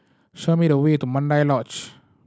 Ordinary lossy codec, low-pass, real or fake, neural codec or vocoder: none; none; real; none